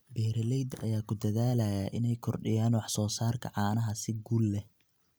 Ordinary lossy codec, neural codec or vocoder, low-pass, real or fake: none; none; none; real